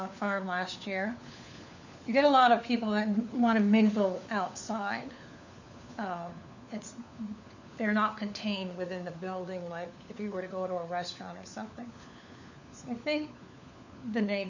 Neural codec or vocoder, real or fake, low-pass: codec, 16 kHz, 4 kbps, FunCodec, trained on LibriTTS, 50 frames a second; fake; 7.2 kHz